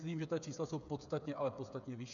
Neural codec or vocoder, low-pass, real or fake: codec, 16 kHz, 8 kbps, FreqCodec, smaller model; 7.2 kHz; fake